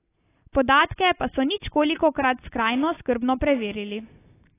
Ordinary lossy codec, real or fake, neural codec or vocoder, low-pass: AAC, 24 kbps; real; none; 3.6 kHz